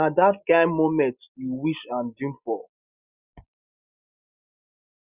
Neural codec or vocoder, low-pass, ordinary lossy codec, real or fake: none; 3.6 kHz; Opus, 64 kbps; real